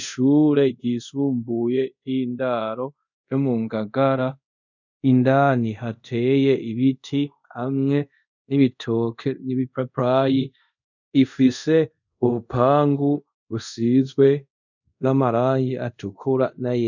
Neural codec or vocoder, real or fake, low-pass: codec, 24 kHz, 0.5 kbps, DualCodec; fake; 7.2 kHz